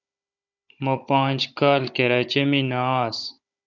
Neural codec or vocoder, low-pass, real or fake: codec, 16 kHz, 16 kbps, FunCodec, trained on Chinese and English, 50 frames a second; 7.2 kHz; fake